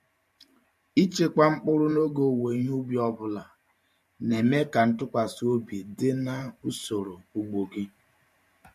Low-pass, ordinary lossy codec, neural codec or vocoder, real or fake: 14.4 kHz; MP3, 64 kbps; vocoder, 44.1 kHz, 128 mel bands every 512 samples, BigVGAN v2; fake